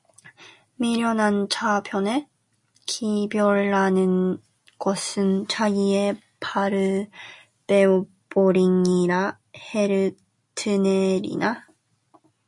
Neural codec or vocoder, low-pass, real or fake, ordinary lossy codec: none; 10.8 kHz; real; MP3, 48 kbps